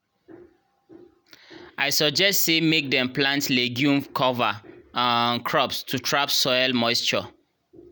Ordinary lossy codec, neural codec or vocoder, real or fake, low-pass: none; none; real; none